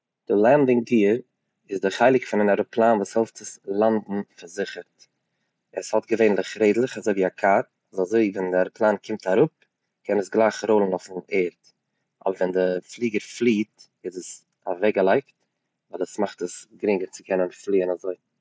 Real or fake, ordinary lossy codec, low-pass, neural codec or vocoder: real; none; none; none